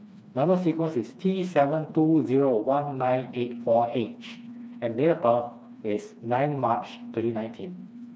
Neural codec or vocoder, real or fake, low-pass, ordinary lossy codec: codec, 16 kHz, 2 kbps, FreqCodec, smaller model; fake; none; none